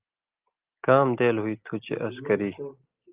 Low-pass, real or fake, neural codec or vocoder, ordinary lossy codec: 3.6 kHz; real; none; Opus, 32 kbps